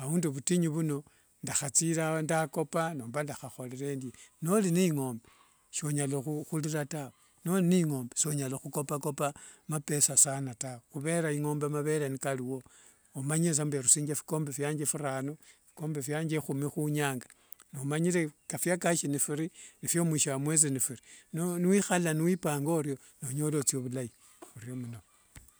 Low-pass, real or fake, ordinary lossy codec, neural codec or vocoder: none; real; none; none